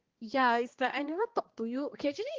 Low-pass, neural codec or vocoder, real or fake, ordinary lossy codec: 7.2 kHz; codec, 16 kHz, 2 kbps, X-Codec, HuBERT features, trained on balanced general audio; fake; Opus, 16 kbps